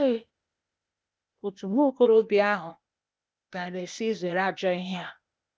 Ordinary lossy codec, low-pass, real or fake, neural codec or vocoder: none; none; fake; codec, 16 kHz, 0.8 kbps, ZipCodec